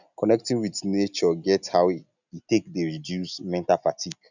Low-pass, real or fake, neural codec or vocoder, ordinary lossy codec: 7.2 kHz; real; none; none